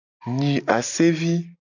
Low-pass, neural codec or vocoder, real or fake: 7.2 kHz; none; real